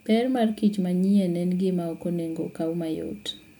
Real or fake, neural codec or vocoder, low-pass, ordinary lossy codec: real; none; 19.8 kHz; MP3, 96 kbps